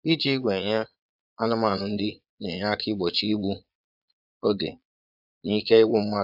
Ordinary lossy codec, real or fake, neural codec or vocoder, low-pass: AAC, 48 kbps; fake; vocoder, 22.05 kHz, 80 mel bands, Vocos; 5.4 kHz